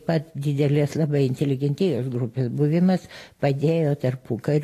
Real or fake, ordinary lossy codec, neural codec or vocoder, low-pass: real; AAC, 48 kbps; none; 14.4 kHz